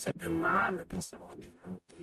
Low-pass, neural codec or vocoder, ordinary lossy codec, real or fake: 14.4 kHz; codec, 44.1 kHz, 0.9 kbps, DAC; MP3, 64 kbps; fake